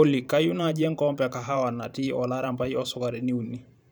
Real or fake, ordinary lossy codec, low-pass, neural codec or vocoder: fake; none; none; vocoder, 44.1 kHz, 128 mel bands every 512 samples, BigVGAN v2